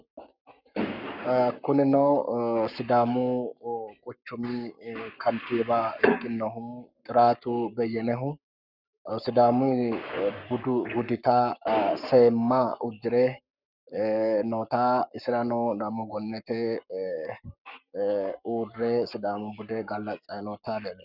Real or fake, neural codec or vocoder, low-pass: fake; codec, 44.1 kHz, 7.8 kbps, Pupu-Codec; 5.4 kHz